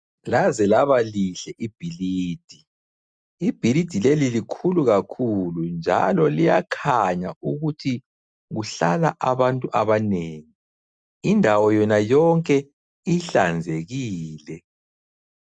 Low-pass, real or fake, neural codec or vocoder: 9.9 kHz; real; none